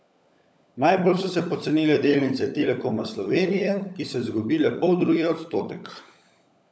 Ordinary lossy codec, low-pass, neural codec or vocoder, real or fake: none; none; codec, 16 kHz, 16 kbps, FunCodec, trained on LibriTTS, 50 frames a second; fake